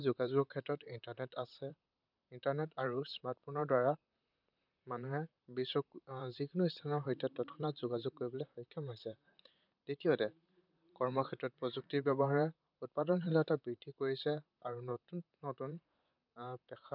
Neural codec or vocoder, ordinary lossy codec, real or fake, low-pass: vocoder, 44.1 kHz, 128 mel bands, Pupu-Vocoder; none; fake; 5.4 kHz